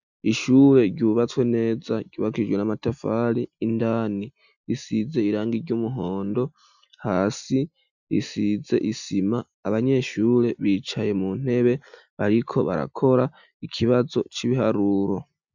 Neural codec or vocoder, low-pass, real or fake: none; 7.2 kHz; real